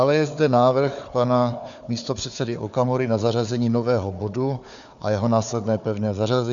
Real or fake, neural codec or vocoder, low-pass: fake; codec, 16 kHz, 4 kbps, FunCodec, trained on Chinese and English, 50 frames a second; 7.2 kHz